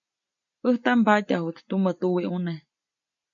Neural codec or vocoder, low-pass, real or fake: none; 7.2 kHz; real